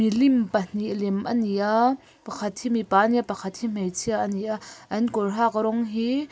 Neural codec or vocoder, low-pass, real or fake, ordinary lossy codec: none; none; real; none